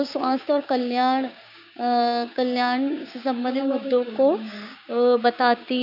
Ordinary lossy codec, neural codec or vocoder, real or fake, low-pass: none; codec, 16 kHz, 6 kbps, DAC; fake; 5.4 kHz